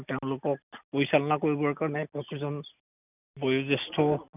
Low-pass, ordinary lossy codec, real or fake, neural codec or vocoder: 3.6 kHz; none; real; none